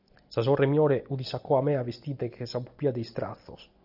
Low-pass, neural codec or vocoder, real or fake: 5.4 kHz; none; real